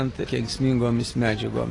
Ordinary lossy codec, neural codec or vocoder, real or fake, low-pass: AAC, 32 kbps; none; real; 10.8 kHz